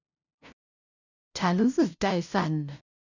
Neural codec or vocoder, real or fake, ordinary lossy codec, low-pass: codec, 16 kHz, 0.5 kbps, FunCodec, trained on LibriTTS, 25 frames a second; fake; none; 7.2 kHz